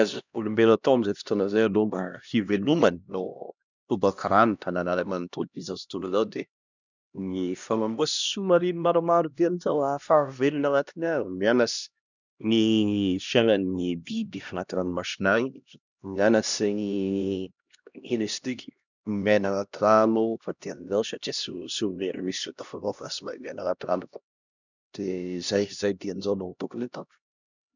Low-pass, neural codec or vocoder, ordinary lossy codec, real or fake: 7.2 kHz; codec, 16 kHz, 1 kbps, X-Codec, HuBERT features, trained on LibriSpeech; none; fake